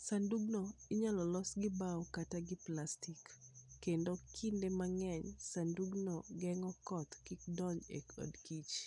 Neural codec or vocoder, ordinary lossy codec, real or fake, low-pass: none; none; real; 10.8 kHz